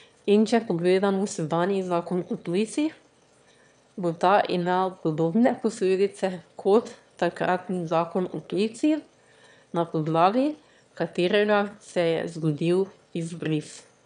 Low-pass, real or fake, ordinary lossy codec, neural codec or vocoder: 9.9 kHz; fake; none; autoencoder, 22.05 kHz, a latent of 192 numbers a frame, VITS, trained on one speaker